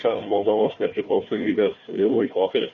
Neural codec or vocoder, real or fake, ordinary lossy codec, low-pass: codec, 16 kHz, 1 kbps, FunCodec, trained on Chinese and English, 50 frames a second; fake; MP3, 32 kbps; 7.2 kHz